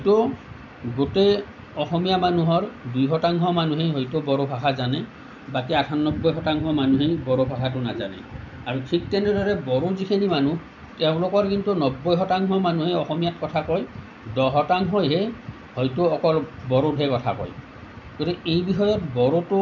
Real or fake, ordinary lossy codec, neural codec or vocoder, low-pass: real; none; none; 7.2 kHz